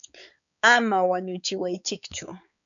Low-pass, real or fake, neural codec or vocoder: 7.2 kHz; fake; codec, 16 kHz, 4 kbps, X-Codec, HuBERT features, trained on balanced general audio